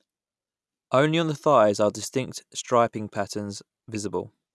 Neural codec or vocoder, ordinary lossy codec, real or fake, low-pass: none; none; real; none